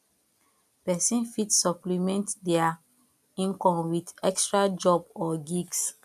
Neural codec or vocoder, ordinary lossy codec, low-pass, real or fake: none; none; 14.4 kHz; real